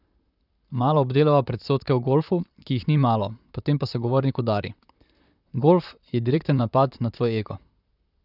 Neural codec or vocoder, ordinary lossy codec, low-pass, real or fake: vocoder, 44.1 kHz, 128 mel bands, Pupu-Vocoder; none; 5.4 kHz; fake